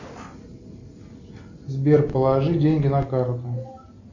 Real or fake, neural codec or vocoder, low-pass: real; none; 7.2 kHz